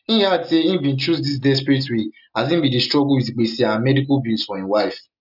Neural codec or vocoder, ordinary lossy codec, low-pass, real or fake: none; none; 5.4 kHz; real